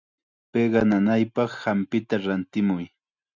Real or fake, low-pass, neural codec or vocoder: real; 7.2 kHz; none